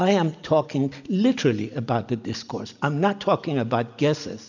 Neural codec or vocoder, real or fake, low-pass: none; real; 7.2 kHz